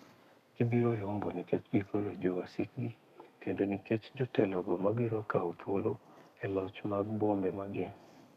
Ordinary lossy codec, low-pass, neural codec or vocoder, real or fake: none; 14.4 kHz; codec, 32 kHz, 1.9 kbps, SNAC; fake